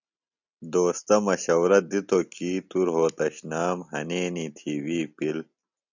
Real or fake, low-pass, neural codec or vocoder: real; 7.2 kHz; none